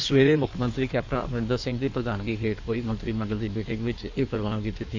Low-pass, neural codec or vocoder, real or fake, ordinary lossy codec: 7.2 kHz; codec, 16 kHz in and 24 kHz out, 1.1 kbps, FireRedTTS-2 codec; fake; MP3, 48 kbps